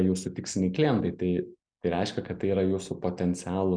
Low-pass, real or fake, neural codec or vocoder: 9.9 kHz; real; none